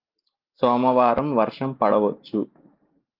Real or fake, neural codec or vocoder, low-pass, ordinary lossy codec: real; none; 5.4 kHz; Opus, 32 kbps